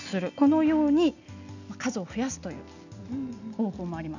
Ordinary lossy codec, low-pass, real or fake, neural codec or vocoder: none; 7.2 kHz; real; none